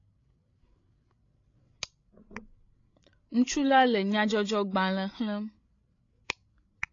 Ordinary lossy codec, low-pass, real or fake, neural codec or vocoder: AAC, 32 kbps; 7.2 kHz; fake; codec, 16 kHz, 16 kbps, FreqCodec, larger model